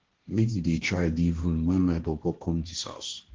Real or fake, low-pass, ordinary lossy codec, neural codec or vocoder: fake; 7.2 kHz; Opus, 16 kbps; codec, 16 kHz, 1.1 kbps, Voila-Tokenizer